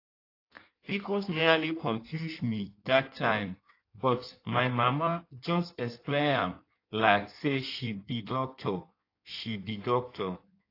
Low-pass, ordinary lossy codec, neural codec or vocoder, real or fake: 5.4 kHz; AAC, 32 kbps; codec, 16 kHz in and 24 kHz out, 1.1 kbps, FireRedTTS-2 codec; fake